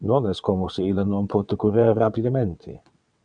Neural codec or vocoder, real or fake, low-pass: vocoder, 22.05 kHz, 80 mel bands, WaveNeXt; fake; 9.9 kHz